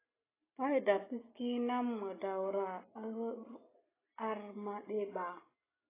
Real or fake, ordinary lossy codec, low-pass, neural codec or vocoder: real; AAC, 16 kbps; 3.6 kHz; none